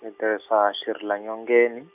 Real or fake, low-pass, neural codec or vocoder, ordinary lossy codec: real; 3.6 kHz; none; none